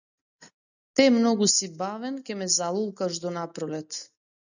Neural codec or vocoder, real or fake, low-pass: none; real; 7.2 kHz